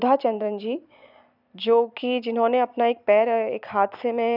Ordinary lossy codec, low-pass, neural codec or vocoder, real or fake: none; 5.4 kHz; vocoder, 44.1 kHz, 128 mel bands every 256 samples, BigVGAN v2; fake